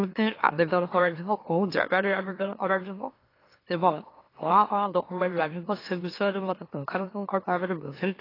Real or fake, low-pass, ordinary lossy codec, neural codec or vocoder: fake; 5.4 kHz; AAC, 24 kbps; autoencoder, 44.1 kHz, a latent of 192 numbers a frame, MeloTTS